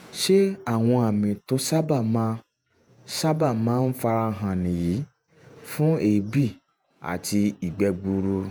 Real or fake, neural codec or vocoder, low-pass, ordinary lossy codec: fake; vocoder, 48 kHz, 128 mel bands, Vocos; none; none